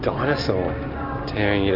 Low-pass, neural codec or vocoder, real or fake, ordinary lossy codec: 5.4 kHz; none; real; MP3, 32 kbps